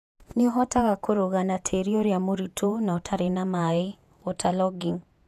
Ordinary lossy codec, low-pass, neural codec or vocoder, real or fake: none; 14.4 kHz; vocoder, 48 kHz, 128 mel bands, Vocos; fake